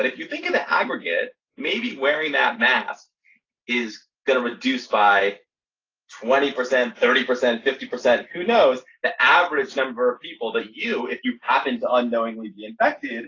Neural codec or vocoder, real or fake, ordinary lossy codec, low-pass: none; real; AAC, 32 kbps; 7.2 kHz